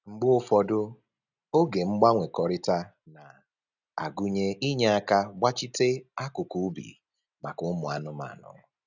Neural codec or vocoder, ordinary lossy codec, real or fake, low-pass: none; none; real; 7.2 kHz